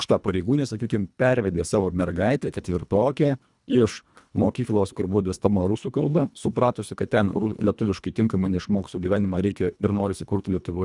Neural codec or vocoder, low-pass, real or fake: codec, 24 kHz, 1.5 kbps, HILCodec; 10.8 kHz; fake